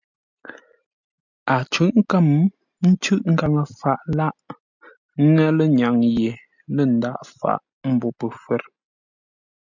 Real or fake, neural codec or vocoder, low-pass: real; none; 7.2 kHz